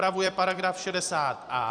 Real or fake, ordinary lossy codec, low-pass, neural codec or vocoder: fake; Opus, 64 kbps; 9.9 kHz; vocoder, 44.1 kHz, 128 mel bands every 256 samples, BigVGAN v2